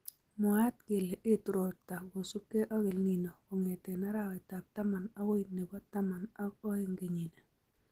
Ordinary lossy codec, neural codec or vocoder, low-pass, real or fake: Opus, 16 kbps; none; 14.4 kHz; real